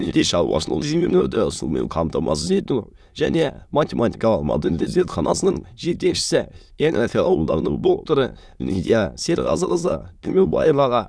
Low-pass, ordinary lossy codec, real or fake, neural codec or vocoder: none; none; fake; autoencoder, 22.05 kHz, a latent of 192 numbers a frame, VITS, trained on many speakers